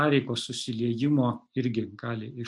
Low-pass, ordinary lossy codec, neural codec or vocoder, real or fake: 10.8 kHz; MP3, 64 kbps; none; real